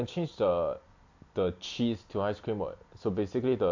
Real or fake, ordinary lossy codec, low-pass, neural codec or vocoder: real; AAC, 48 kbps; 7.2 kHz; none